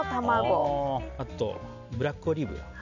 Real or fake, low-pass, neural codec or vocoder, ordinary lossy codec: real; 7.2 kHz; none; none